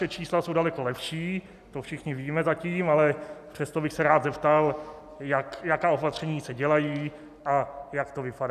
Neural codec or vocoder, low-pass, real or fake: none; 14.4 kHz; real